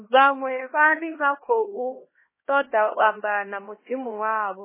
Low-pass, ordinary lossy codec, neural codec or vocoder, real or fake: 3.6 kHz; MP3, 16 kbps; codec, 16 kHz, 1 kbps, X-Codec, HuBERT features, trained on LibriSpeech; fake